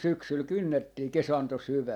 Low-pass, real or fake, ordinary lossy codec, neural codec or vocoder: 19.8 kHz; real; none; none